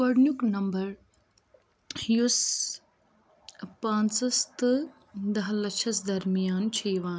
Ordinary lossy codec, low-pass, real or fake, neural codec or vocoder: none; none; real; none